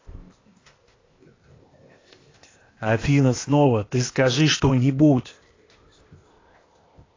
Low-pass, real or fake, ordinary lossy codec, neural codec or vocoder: 7.2 kHz; fake; AAC, 32 kbps; codec, 16 kHz, 0.8 kbps, ZipCodec